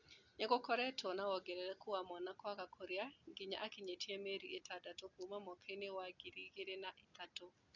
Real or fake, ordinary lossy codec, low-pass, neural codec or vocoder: real; none; 7.2 kHz; none